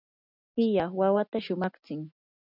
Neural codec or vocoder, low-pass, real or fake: none; 5.4 kHz; real